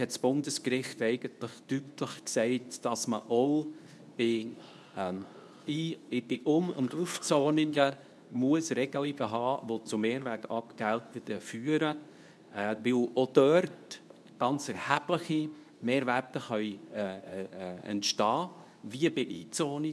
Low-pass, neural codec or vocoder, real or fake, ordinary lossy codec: none; codec, 24 kHz, 0.9 kbps, WavTokenizer, medium speech release version 2; fake; none